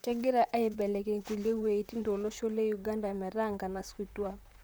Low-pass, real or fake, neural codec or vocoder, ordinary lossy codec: none; fake; vocoder, 44.1 kHz, 128 mel bands, Pupu-Vocoder; none